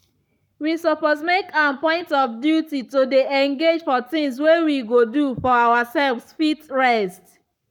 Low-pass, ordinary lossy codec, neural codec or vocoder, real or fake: 19.8 kHz; none; codec, 44.1 kHz, 7.8 kbps, Pupu-Codec; fake